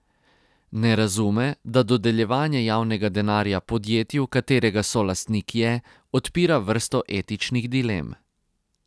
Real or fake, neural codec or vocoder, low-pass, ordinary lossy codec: real; none; none; none